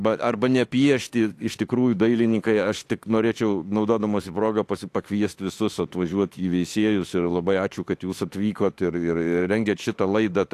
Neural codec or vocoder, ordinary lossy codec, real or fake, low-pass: autoencoder, 48 kHz, 32 numbers a frame, DAC-VAE, trained on Japanese speech; AAC, 64 kbps; fake; 14.4 kHz